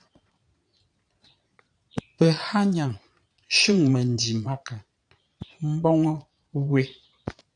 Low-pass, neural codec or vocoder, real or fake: 9.9 kHz; vocoder, 22.05 kHz, 80 mel bands, Vocos; fake